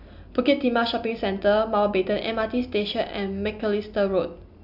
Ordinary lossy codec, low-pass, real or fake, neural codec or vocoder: none; 5.4 kHz; real; none